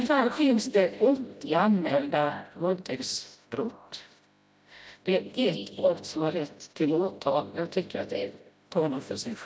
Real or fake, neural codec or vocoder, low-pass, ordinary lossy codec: fake; codec, 16 kHz, 0.5 kbps, FreqCodec, smaller model; none; none